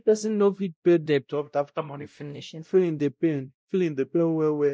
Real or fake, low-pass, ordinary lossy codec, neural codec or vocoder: fake; none; none; codec, 16 kHz, 0.5 kbps, X-Codec, WavLM features, trained on Multilingual LibriSpeech